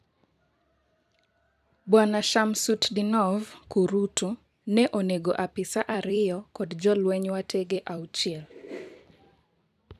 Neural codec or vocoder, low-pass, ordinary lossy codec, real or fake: vocoder, 44.1 kHz, 128 mel bands every 256 samples, BigVGAN v2; 14.4 kHz; none; fake